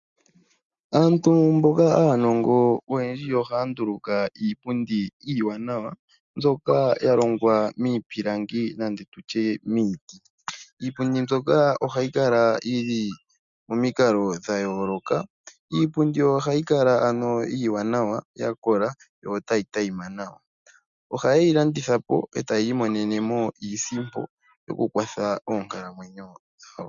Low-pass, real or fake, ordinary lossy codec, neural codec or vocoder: 7.2 kHz; real; MP3, 96 kbps; none